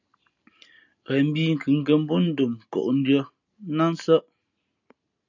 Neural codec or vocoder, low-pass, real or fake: none; 7.2 kHz; real